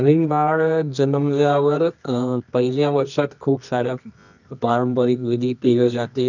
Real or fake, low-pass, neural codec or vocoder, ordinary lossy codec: fake; 7.2 kHz; codec, 24 kHz, 0.9 kbps, WavTokenizer, medium music audio release; none